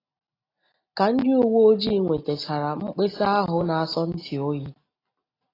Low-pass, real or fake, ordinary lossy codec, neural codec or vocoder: 5.4 kHz; real; AAC, 24 kbps; none